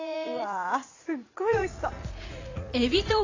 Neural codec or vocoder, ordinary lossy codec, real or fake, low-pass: vocoder, 44.1 kHz, 80 mel bands, Vocos; AAC, 32 kbps; fake; 7.2 kHz